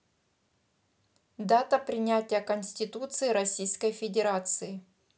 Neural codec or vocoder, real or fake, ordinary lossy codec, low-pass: none; real; none; none